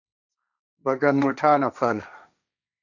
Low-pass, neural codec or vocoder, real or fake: 7.2 kHz; codec, 16 kHz, 1.1 kbps, Voila-Tokenizer; fake